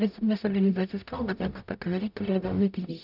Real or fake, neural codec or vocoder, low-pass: fake; codec, 44.1 kHz, 0.9 kbps, DAC; 5.4 kHz